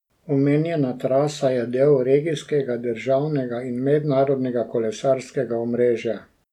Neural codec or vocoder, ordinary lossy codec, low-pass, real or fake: none; none; 19.8 kHz; real